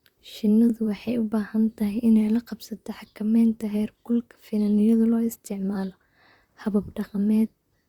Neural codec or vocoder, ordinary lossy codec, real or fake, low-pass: vocoder, 44.1 kHz, 128 mel bands, Pupu-Vocoder; Opus, 64 kbps; fake; 19.8 kHz